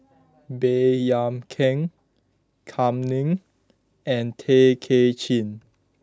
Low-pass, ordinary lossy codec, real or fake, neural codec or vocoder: none; none; real; none